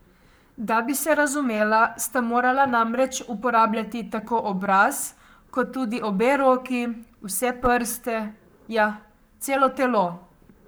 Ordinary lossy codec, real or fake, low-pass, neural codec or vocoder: none; fake; none; codec, 44.1 kHz, 7.8 kbps, Pupu-Codec